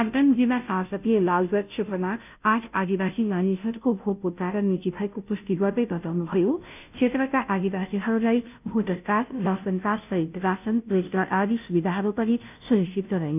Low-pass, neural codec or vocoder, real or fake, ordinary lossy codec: 3.6 kHz; codec, 16 kHz, 0.5 kbps, FunCodec, trained on Chinese and English, 25 frames a second; fake; none